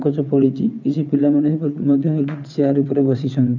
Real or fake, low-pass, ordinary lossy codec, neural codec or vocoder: fake; 7.2 kHz; none; vocoder, 22.05 kHz, 80 mel bands, WaveNeXt